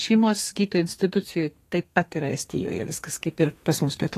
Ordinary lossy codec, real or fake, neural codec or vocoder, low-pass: AAC, 48 kbps; fake; codec, 32 kHz, 1.9 kbps, SNAC; 14.4 kHz